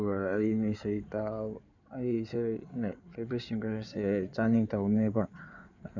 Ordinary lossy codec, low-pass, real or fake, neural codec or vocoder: none; 7.2 kHz; fake; codec, 16 kHz in and 24 kHz out, 2.2 kbps, FireRedTTS-2 codec